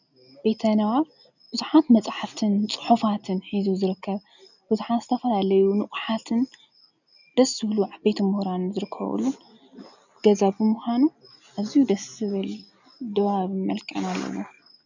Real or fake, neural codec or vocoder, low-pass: real; none; 7.2 kHz